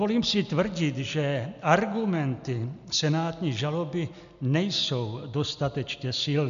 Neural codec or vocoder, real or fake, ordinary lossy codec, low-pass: none; real; AAC, 96 kbps; 7.2 kHz